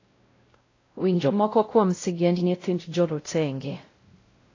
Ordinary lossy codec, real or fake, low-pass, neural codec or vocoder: AAC, 32 kbps; fake; 7.2 kHz; codec, 16 kHz, 0.5 kbps, X-Codec, WavLM features, trained on Multilingual LibriSpeech